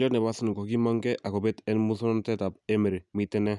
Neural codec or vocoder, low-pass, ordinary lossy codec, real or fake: none; 10.8 kHz; none; real